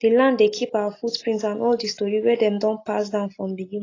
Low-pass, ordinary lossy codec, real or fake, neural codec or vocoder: 7.2 kHz; AAC, 32 kbps; real; none